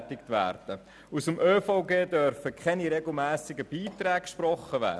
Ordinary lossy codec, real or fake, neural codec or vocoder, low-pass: none; real; none; none